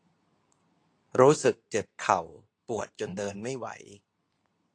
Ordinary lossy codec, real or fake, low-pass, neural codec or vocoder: AAC, 48 kbps; fake; 9.9 kHz; codec, 24 kHz, 0.9 kbps, WavTokenizer, medium speech release version 2